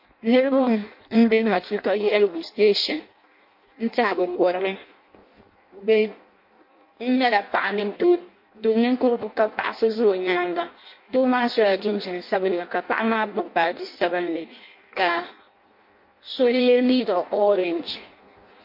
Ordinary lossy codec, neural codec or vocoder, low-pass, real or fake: MP3, 32 kbps; codec, 16 kHz in and 24 kHz out, 0.6 kbps, FireRedTTS-2 codec; 5.4 kHz; fake